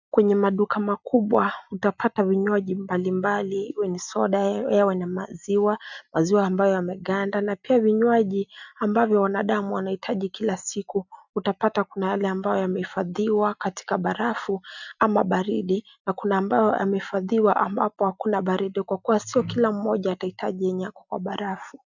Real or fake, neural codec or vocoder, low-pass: real; none; 7.2 kHz